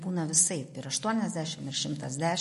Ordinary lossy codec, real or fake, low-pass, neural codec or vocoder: MP3, 48 kbps; real; 14.4 kHz; none